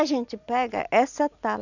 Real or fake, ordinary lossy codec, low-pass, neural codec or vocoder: real; none; 7.2 kHz; none